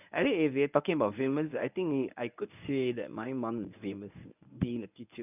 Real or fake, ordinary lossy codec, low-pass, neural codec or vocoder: fake; none; 3.6 kHz; codec, 24 kHz, 0.9 kbps, WavTokenizer, medium speech release version 1